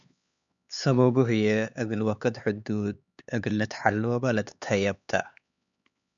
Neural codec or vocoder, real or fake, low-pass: codec, 16 kHz, 4 kbps, X-Codec, HuBERT features, trained on LibriSpeech; fake; 7.2 kHz